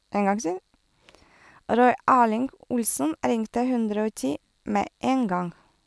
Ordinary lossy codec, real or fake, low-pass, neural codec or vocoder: none; real; none; none